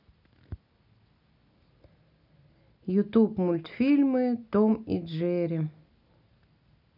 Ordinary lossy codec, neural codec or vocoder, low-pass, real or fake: none; none; 5.4 kHz; real